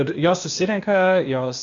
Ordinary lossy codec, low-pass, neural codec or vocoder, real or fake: Opus, 64 kbps; 7.2 kHz; codec, 16 kHz, 0.8 kbps, ZipCodec; fake